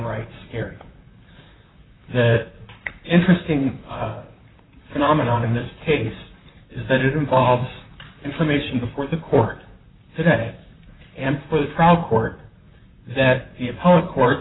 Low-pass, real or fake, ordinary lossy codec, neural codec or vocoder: 7.2 kHz; fake; AAC, 16 kbps; vocoder, 44.1 kHz, 128 mel bands, Pupu-Vocoder